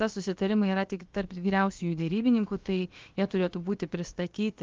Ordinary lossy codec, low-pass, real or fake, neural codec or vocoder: Opus, 32 kbps; 7.2 kHz; fake; codec, 16 kHz, about 1 kbps, DyCAST, with the encoder's durations